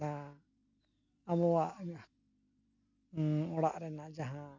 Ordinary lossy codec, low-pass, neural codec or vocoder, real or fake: none; 7.2 kHz; none; real